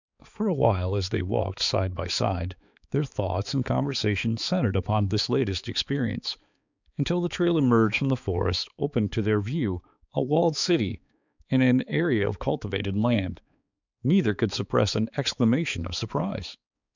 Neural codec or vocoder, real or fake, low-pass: codec, 16 kHz, 4 kbps, X-Codec, HuBERT features, trained on balanced general audio; fake; 7.2 kHz